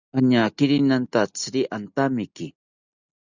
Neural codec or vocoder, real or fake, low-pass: none; real; 7.2 kHz